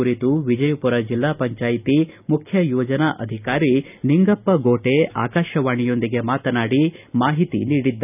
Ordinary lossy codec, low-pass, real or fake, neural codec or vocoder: none; 3.6 kHz; real; none